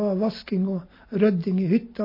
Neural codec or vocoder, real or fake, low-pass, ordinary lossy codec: none; real; 5.4 kHz; MP3, 24 kbps